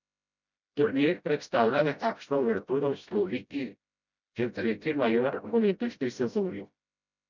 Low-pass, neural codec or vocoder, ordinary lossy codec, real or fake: 7.2 kHz; codec, 16 kHz, 0.5 kbps, FreqCodec, smaller model; none; fake